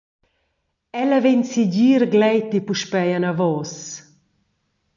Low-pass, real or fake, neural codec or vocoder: 7.2 kHz; real; none